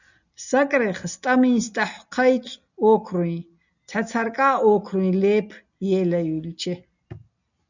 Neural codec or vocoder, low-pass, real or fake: none; 7.2 kHz; real